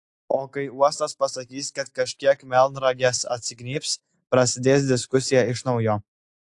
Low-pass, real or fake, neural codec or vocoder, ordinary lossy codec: 10.8 kHz; real; none; AAC, 64 kbps